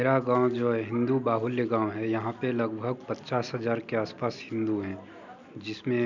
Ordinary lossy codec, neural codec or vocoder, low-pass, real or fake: none; none; 7.2 kHz; real